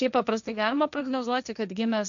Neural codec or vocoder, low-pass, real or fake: codec, 16 kHz, 1.1 kbps, Voila-Tokenizer; 7.2 kHz; fake